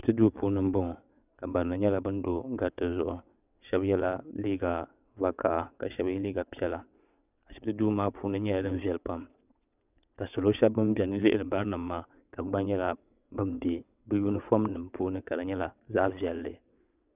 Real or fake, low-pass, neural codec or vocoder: fake; 3.6 kHz; vocoder, 22.05 kHz, 80 mel bands, WaveNeXt